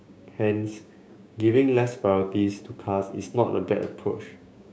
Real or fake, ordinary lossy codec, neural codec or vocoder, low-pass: fake; none; codec, 16 kHz, 6 kbps, DAC; none